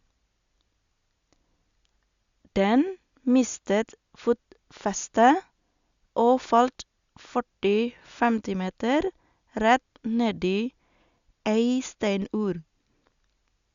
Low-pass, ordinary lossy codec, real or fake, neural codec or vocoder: 7.2 kHz; Opus, 64 kbps; real; none